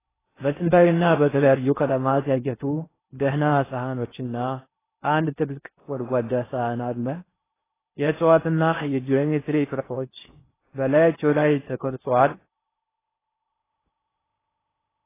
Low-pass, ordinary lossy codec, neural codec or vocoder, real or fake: 3.6 kHz; AAC, 16 kbps; codec, 16 kHz in and 24 kHz out, 0.6 kbps, FocalCodec, streaming, 4096 codes; fake